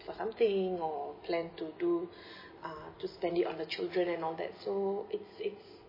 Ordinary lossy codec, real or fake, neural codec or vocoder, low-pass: MP3, 24 kbps; real; none; 5.4 kHz